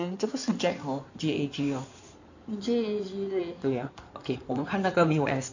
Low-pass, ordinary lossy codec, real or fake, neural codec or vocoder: 7.2 kHz; none; fake; codec, 16 kHz in and 24 kHz out, 2.2 kbps, FireRedTTS-2 codec